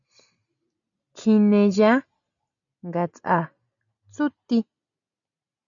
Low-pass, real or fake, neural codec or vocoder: 7.2 kHz; real; none